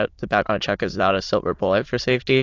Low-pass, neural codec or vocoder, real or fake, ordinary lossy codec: 7.2 kHz; autoencoder, 22.05 kHz, a latent of 192 numbers a frame, VITS, trained on many speakers; fake; AAC, 48 kbps